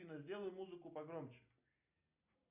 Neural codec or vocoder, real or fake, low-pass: none; real; 3.6 kHz